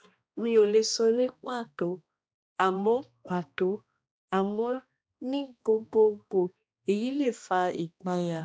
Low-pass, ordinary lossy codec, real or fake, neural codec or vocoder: none; none; fake; codec, 16 kHz, 1 kbps, X-Codec, HuBERT features, trained on balanced general audio